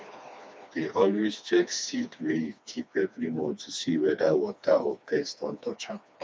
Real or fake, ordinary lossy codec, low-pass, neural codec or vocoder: fake; none; none; codec, 16 kHz, 2 kbps, FreqCodec, smaller model